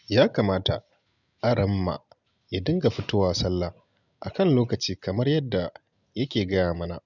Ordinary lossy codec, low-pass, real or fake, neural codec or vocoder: none; 7.2 kHz; real; none